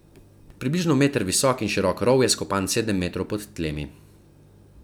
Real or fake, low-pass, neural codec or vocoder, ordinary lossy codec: real; none; none; none